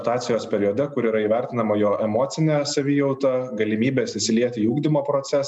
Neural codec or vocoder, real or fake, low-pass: none; real; 10.8 kHz